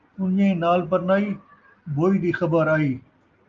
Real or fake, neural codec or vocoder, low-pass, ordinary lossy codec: real; none; 7.2 kHz; Opus, 24 kbps